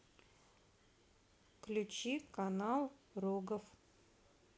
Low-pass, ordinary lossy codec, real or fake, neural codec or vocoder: none; none; real; none